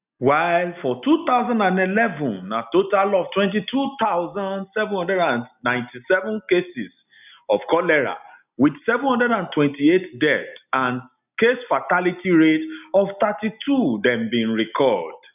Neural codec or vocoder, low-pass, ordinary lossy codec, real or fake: none; 3.6 kHz; none; real